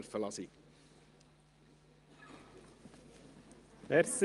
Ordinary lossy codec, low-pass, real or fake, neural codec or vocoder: Opus, 32 kbps; 10.8 kHz; real; none